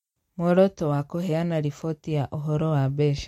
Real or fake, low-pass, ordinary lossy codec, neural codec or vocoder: real; 19.8 kHz; MP3, 64 kbps; none